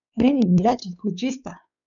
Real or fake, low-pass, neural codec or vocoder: fake; 7.2 kHz; codec, 16 kHz, 4 kbps, X-Codec, WavLM features, trained on Multilingual LibriSpeech